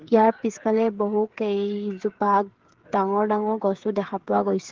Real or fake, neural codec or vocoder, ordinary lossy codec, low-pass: fake; codec, 16 kHz, 8 kbps, FreqCodec, smaller model; Opus, 16 kbps; 7.2 kHz